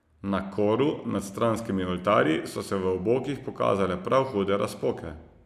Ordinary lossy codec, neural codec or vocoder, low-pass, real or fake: none; none; 14.4 kHz; real